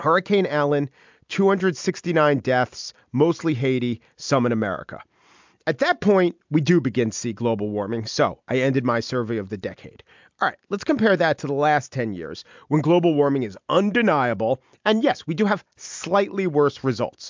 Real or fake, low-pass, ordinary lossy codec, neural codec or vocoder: real; 7.2 kHz; MP3, 64 kbps; none